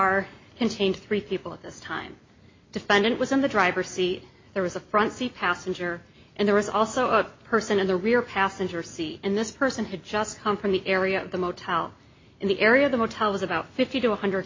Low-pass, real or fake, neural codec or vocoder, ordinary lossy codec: 7.2 kHz; real; none; MP3, 32 kbps